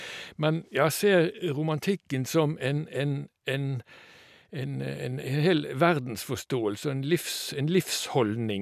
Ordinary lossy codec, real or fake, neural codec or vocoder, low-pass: none; real; none; 14.4 kHz